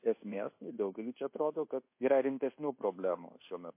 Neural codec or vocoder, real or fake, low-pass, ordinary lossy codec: codec, 24 kHz, 1.2 kbps, DualCodec; fake; 3.6 kHz; MP3, 24 kbps